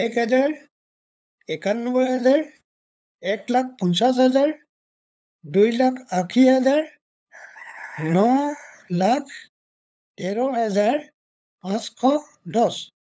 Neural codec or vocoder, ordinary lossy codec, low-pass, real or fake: codec, 16 kHz, 8 kbps, FunCodec, trained on LibriTTS, 25 frames a second; none; none; fake